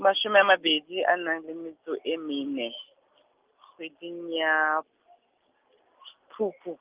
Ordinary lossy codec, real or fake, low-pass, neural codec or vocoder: Opus, 32 kbps; real; 3.6 kHz; none